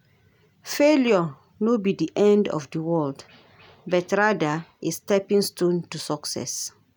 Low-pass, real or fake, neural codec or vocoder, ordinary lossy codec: none; real; none; none